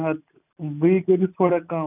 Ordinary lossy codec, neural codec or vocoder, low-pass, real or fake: none; none; 3.6 kHz; real